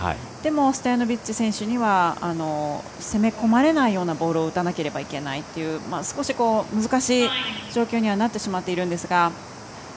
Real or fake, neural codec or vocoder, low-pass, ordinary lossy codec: real; none; none; none